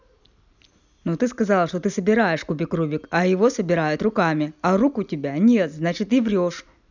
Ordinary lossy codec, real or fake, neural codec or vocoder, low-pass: none; real; none; 7.2 kHz